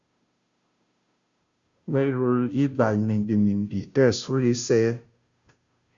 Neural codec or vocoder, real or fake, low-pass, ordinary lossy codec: codec, 16 kHz, 0.5 kbps, FunCodec, trained on Chinese and English, 25 frames a second; fake; 7.2 kHz; Opus, 64 kbps